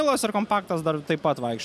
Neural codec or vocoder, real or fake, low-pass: none; real; 14.4 kHz